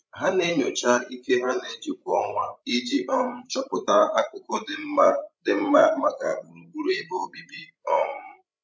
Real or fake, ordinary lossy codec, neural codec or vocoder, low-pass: fake; none; codec, 16 kHz, 16 kbps, FreqCodec, larger model; none